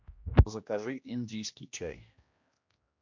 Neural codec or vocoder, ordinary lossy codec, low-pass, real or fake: codec, 16 kHz, 1 kbps, X-Codec, HuBERT features, trained on general audio; MP3, 48 kbps; 7.2 kHz; fake